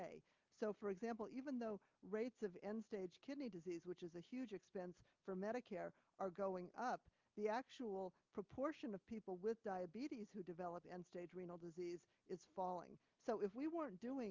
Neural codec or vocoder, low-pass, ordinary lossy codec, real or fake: none; 7.2 kHz; Opus, 32 kbps; real